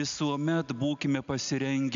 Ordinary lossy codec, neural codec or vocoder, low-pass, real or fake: MP3, 64 kbps; none; 7.2 kHz; real